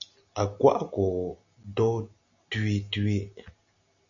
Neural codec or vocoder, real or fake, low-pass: none; real; 7.2 kHz